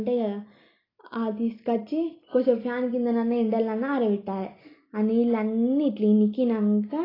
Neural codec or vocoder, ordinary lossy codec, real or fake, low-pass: none; AAC, 24 kbps; real; 5.4 kHz